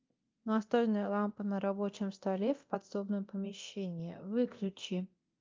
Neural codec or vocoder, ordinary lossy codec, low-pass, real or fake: codec, 24 kHz, 0.9 kbps, DualCodec; Opus, 24 kbps; 7.2 kHz; fake